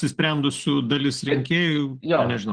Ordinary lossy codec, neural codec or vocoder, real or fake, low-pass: Opus, 16 kbps; none; real; 9.9 kHz